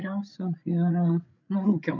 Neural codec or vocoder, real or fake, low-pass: codec, 16 kHz, 8 kbps, FreqCodec, larger model; fake; 7.2 kHz